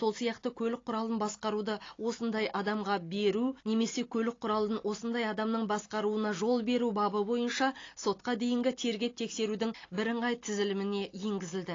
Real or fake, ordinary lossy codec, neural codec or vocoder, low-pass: real; AAC, 32 kbps; none; 7.2 kHz